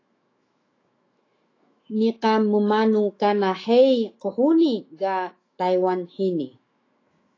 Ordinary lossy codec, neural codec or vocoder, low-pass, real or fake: AAC, 32 kbps; autoencoder, 48 kHz, 128 numbers a frame, DAC-VAE, trained on Japanese speech; 7.2 kHz; fake